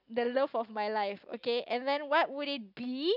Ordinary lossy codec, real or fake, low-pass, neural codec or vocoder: none; real; 5.4 kHz; none